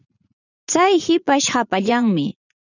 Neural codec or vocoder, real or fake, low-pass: vocoder, 44.1 kHz, 80 mel bands, Vocos; fake; 7.2 kHz